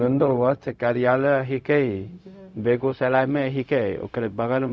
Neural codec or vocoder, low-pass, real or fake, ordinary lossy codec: codec, 16 kHz, 0.4 kbps, LongCat-Audio-Codec; none; fake; none